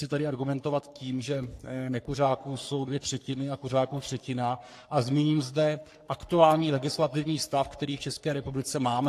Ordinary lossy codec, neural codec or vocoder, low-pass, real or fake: AAC, 64 kbps; codec, 44.1 kHz, 3.4 kbps, Pupu-Codec; 14.4 kHz; fake